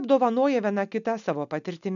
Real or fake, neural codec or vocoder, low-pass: real; none; 7.2 kHz